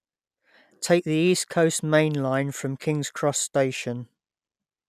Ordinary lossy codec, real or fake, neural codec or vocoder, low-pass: none; real; none; 14.4 kHz